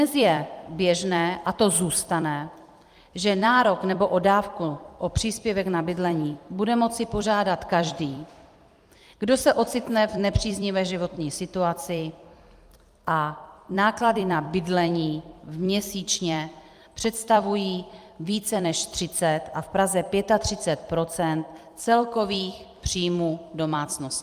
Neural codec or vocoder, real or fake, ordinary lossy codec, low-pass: vocoder, 44.1 kHz, 128 mel bands every 256 samples, BigVGAN v2; fake; Opus, 32 kbps; 14.4 kHz